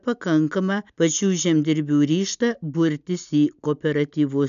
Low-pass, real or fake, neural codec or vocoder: 7.2 kHz; real; none